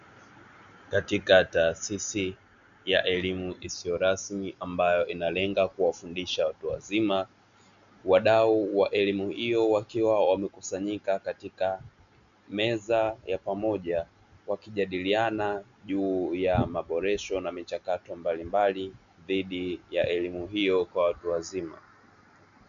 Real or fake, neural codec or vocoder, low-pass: real; none; 7.2 kHz